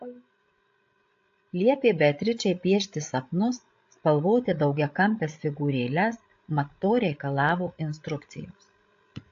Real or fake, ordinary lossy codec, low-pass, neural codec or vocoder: fake; AAC, 48 kbps; 7.2 kHz; codec, 16 kHz, 16 kbps, FreqCodec, larger model